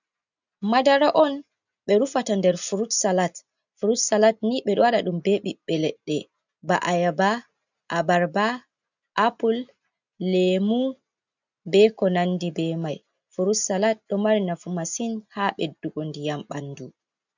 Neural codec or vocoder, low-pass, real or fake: none; 7.2 kHz; real